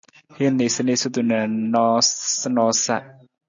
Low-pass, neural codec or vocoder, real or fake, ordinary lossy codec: 7.2 kHz; none; real; AAC, 64 kbps